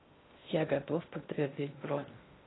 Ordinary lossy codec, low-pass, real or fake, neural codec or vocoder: AAC, 16 kbps; 7.2 kHz; fake; codec, 16 kHz in and 24 kHz out, 0.6 kbps, FocalCodec, streaming, 2048 codes